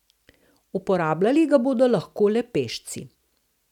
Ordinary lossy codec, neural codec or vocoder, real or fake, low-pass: none; none; real; 19.8 kHz